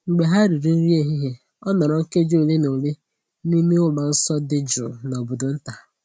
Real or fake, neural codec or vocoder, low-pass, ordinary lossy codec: real; none; none; none